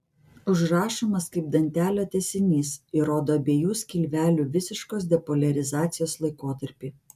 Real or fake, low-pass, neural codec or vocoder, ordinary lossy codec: real; 14.4 kHz; none; MP3, 96 kbps